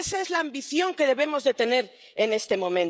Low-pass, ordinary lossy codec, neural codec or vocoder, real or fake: none; none; codec, 16 kHz, 16 kbps, FreqCodec, smaller model; fake